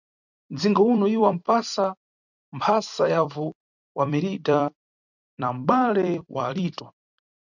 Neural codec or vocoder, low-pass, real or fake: vocoder, 44.1 kHz, 128 mel bands every 256 samples, BigVGAN v2; 7.2 kHz; fake